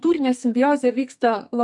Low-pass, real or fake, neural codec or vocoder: 10.8 kHz; fake; codec, 44.1 kHz, 2.6 kbps, SNAC